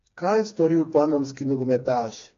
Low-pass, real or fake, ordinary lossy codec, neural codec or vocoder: 7.2 kHz; fake; AAC, 48 kbps; codec, 16 kHz, 2 kbps, FreqCodec, smaller model